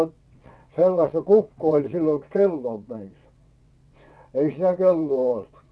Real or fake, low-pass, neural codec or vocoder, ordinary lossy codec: fake; none; vocoder, 22.05 kHz, 80 mel bands, WaveNeXt; none